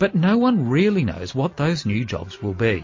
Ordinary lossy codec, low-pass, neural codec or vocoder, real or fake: MP3, 32 kbps; 7.2 kHz; none; real